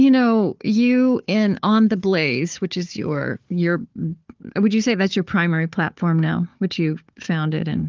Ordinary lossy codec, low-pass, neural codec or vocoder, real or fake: Opus, 32 kbps; 7.2 kHz; codec, 16 kHz, 4 kbps, FunCodec, trained on Chinese and English, 50 frames a second; fake